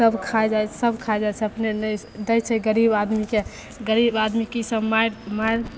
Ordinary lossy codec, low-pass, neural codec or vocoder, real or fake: none; none; none; real